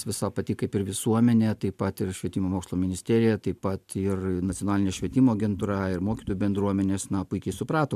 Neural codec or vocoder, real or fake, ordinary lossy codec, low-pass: none; real; MP3, 96 kbps; 14.4 kHz